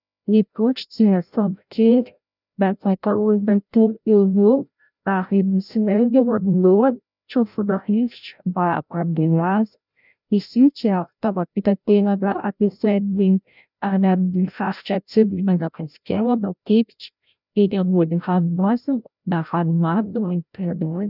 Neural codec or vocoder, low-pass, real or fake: codec, 16 kHz, 0.5 kbps, FreqCodec, larger model; 5.4 kHz; fake